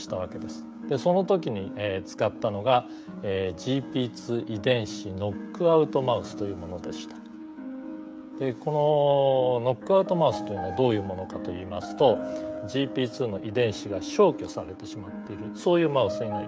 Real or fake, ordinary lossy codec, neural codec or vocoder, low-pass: fake; none; codec, 16 kHz, 16 kbps, FreqCodec, smaller model; none